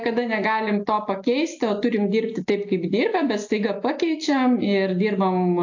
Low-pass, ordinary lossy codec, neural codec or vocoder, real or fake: 7.2 kHz; AAC, 48 kbps; none; real